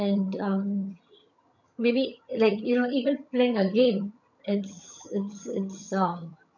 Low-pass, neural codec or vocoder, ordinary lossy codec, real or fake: 7.2 kHz; vocoder, 22.05 kHz, 80 mel bands, HiFi-GAN; none; fake